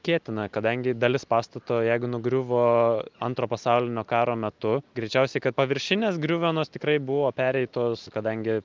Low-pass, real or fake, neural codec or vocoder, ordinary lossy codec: 7.2 kHz; real; none; Opus, 24 kbps